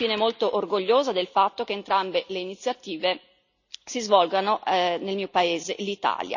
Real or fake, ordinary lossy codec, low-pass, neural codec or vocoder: real; none; 7.2 kHz; none